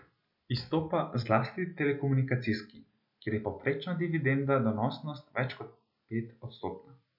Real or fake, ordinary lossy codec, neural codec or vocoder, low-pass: real; none; none; 5.4 kHz